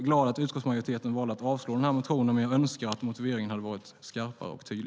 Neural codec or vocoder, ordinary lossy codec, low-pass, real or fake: none; none; none; real